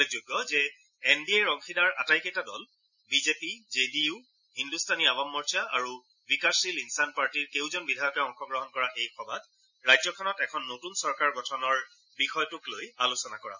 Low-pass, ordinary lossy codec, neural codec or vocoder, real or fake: 7.2 kHz; none; none; real